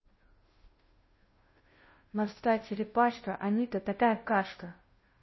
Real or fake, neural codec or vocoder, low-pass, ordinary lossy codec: fake; codec, 16 kHz, 0.5 kbps, FunCodec, trained on Chinese and English, 25 frames a second; 7.2 kHz; MP3, 24 kbps